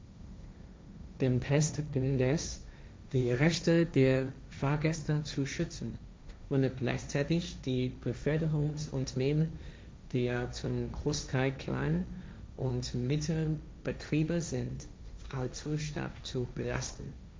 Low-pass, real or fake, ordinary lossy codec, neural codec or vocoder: none; fake; none; codec, 16 kHz, 1.1 kbps, Voila-Tokenizer